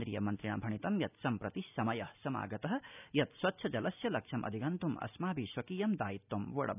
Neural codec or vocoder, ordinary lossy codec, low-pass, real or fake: none; none; 3.6 kHz; real